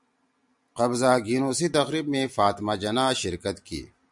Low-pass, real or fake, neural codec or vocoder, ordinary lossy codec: 10.8 kHz; real; none; MP3, 96 kbps